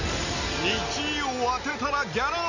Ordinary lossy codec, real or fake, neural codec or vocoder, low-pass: none; real; none; 7.2 kHz